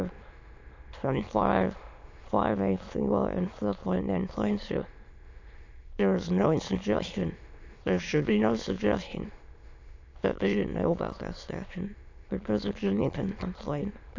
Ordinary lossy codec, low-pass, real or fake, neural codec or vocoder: AAC, 48 kbps; 7.2 kHz; fake; autoencoder, 22.05 kHz, a latent of 192 numbers a frame, VITS, trained on many speakers